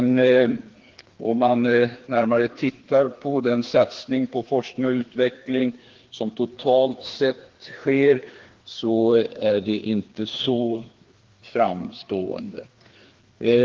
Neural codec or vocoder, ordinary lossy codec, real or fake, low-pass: codec, 16 kHz, 2 kbps, FreqCodec, larger model; Opus, 16 kbps; fake; 7.2 kHz